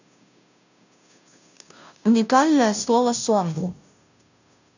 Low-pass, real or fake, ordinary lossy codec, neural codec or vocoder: 7.2 kHz; fake; none; codec, 16 kHz, 0.5 kbps, FunCodec, trained on Chinese and English, 25 frames a second